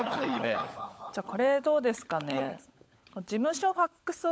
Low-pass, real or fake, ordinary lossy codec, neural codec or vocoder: none; fake; none; codec, 16 kHz, 16 kbps, FunCodec, trained on LibriTTS, 50 frames a second